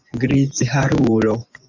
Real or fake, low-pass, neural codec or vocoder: fake; 7.2 kHz; vocoder, 24 kHz, 100 mel bands, Vocos